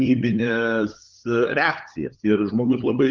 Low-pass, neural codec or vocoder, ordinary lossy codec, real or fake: 7.2 kHz; codec, 16 kHz, 8 kbps, FunCodec, trained on LibriTTS, 25 frames a second; Opus, 32 kbps; fake